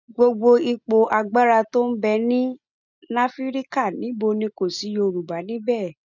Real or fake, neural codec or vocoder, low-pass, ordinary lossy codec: real; none; 7.2 kHz; none